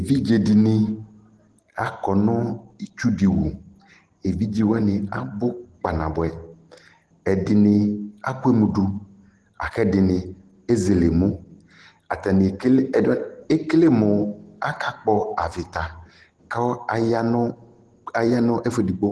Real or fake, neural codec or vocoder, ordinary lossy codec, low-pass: real; none; Opus, 16 kbps; 10.8 kHz